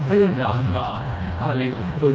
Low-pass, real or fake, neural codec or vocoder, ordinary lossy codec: none; fake; codec, 16 kHz, 1 kbps, FreqCodec, smaller model; none